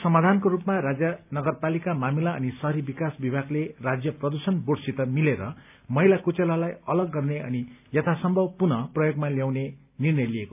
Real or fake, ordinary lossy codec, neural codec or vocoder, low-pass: real; AAC, 32 kbps; none; 3.6 kHz